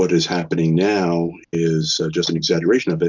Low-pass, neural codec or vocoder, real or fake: 7.2 kHz; none; real